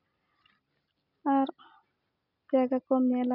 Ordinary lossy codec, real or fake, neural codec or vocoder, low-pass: none; real; none; 5.4 kHz